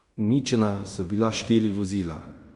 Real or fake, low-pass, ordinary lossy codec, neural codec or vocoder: fake; 10.8 kHz; Opus, 64 kbps; codec, 16 kHz in and 24 kHz out, 0.9 kbps, LongCat-Audio-Codec, fine tuned four codebook decoder